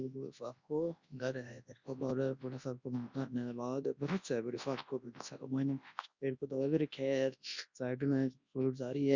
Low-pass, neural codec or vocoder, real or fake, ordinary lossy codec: 7.2 kHz; codec, 24 kHz, 0.9 kbps, WavTokenizer, large speech release; fake; Opus, 64 kbps